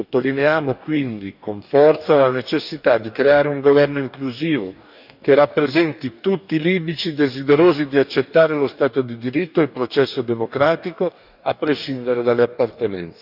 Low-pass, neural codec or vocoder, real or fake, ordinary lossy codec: 5.4 kHz; codec, 44.1 kHz, 2.6 kbps, DAC; fake; none